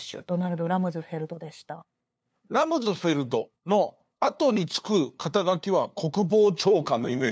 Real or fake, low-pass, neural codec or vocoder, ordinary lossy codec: fake; none; codec, 16 kHz, 2 kbps, FunCodec, trained on LibriTTS, 25 frames a second; none